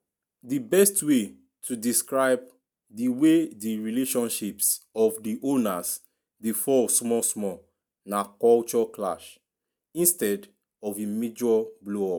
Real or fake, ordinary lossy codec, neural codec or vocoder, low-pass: real; none; none; none